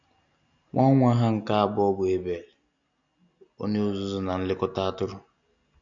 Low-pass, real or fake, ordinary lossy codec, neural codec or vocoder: 7.2 kHz; real; none; none